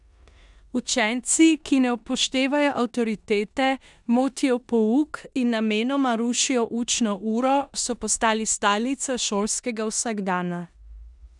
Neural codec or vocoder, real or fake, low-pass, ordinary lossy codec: codec, 16 kHz in and 24 kHz out, 0.9 kbps, LongCat-Audio-Codec, four codebook decoder; fake; 10.8 kHz; none